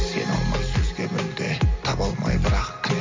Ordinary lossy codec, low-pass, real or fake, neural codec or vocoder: MP3, 48 kbps; 7.2 kHz; real; none